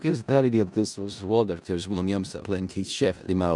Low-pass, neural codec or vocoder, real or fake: 10.8 kHz; codec, 16 kHz in and 24 kHz out, 0.4 kbps, LongCat-Audio-Codec, four codebook decoder; fake